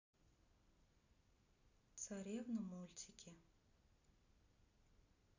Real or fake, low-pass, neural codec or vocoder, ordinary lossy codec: real; 7.2 kHz; none; none